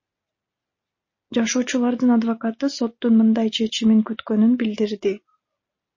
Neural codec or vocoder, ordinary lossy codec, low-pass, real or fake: none; MP3, 32 kbps; 7.2 kHz; real